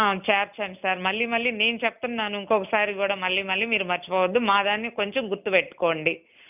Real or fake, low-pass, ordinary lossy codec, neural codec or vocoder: real; 3.6 kHz; none; none